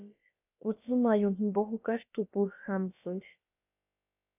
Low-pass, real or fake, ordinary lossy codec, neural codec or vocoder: 3.6 kHz; fake; AAC, 32 kbps; codec, 16 kHz, about 1 kbps, DyCAST, with the encoder's durations